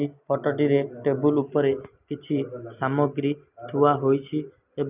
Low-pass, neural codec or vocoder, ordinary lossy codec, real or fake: 3.6 kHz; none; none; real